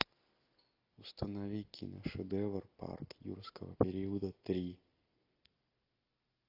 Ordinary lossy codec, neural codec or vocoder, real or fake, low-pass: AAC, 32 kbps; none; real; 5.4 kHz